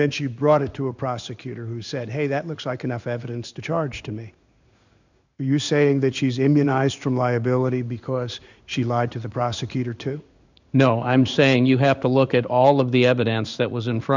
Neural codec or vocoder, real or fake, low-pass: codec, 16 kHz in and 24 kHz out, 1 kbps, XY-Tokenizer; fake; 7.2 kHz